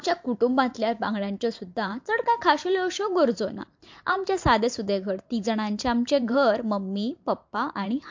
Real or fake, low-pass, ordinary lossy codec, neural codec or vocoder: fake; 7.2 kHz; MP3, 48 kbps; vocoder, 44.1 kHz, 128 mel bands every 512 samples, BigVGAN v2